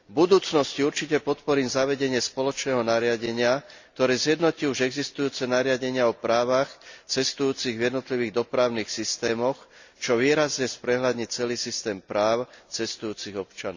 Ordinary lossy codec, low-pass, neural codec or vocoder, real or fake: Opus, 64 kbps; 7.2 kHz; none; real